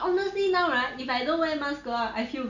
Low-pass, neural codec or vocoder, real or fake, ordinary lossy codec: 7.2 kHz; none; real; none